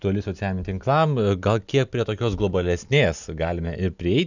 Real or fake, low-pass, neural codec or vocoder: real; 7.2 kHz; none